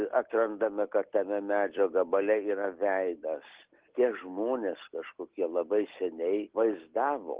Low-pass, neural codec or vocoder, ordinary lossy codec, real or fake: 3.6 kHz; none; Opus, 16 kbps; real